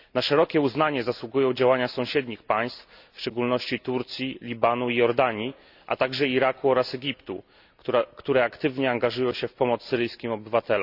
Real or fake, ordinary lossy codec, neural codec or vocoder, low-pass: real; none; none; 5.4 kHz